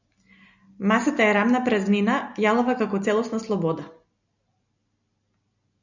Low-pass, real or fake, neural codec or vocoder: 7.2 kHz; real; none